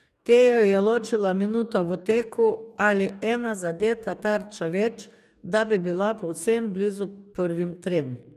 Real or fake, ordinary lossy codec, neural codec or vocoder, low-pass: fake; none; codec, 44.1 kHz, 2.6 kbps, DAC; 14.4 kHz